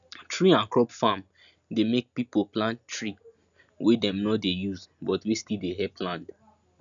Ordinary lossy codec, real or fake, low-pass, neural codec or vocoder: none; real; 7.2 kHz; none